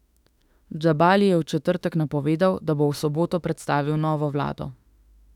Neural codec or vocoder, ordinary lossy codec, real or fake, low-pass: autoencoder, 48 kHz, 32 numbers a frame, DAC-VAE, trained on Japanese speech; none; fake; 19.8 kHz